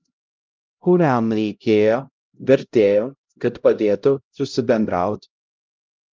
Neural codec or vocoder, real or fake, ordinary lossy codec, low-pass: codec, 16 kHz, 0.5 kbps, X-Codec, HuBERT features, trained on LibriSpeech; fake; Opus, 24 kbps; 7.2 kHz